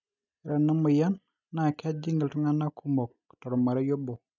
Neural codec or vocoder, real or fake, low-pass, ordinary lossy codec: none; real; 7.2 kHz; none